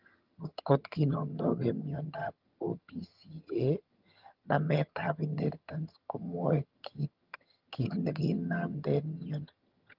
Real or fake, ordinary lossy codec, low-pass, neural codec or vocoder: fake; Opus, 32 kbps; 5.4 kHz; vocoder, 22.05 kHz, 80 mel bands, HiFi-GAN